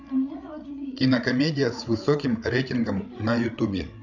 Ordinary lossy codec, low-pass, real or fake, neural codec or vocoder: none; 7.2 kHz; fake; codec, 16 kHz, 8 kbps, FreqCodec, larger model